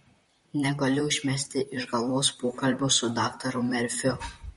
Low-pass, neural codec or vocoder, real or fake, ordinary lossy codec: 19.8 kHz; vocoder, 44.1 kHz, 128 mel bands, Pupu-Vocoder; fake; MP3, 48 kbps